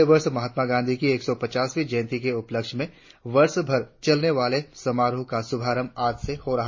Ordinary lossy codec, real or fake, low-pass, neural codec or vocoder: MP3, 32 kbps; real; 7.2 kHz; none